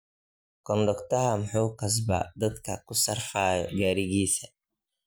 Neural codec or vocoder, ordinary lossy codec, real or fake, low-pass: none; none; real; none